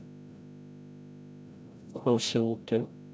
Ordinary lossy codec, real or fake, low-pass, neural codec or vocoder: none; fake; none; codec, 16 kHz, 0.5 kbps, FreqCodec, larger model